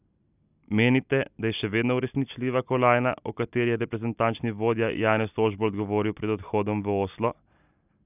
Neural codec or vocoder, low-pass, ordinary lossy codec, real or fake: none; 3.6 kHz; none; real